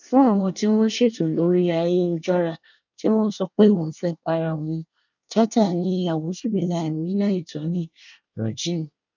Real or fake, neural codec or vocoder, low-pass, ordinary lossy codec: fake; codec, 24 kHz, 1 kbps, SNAC; 7.2 kHz; none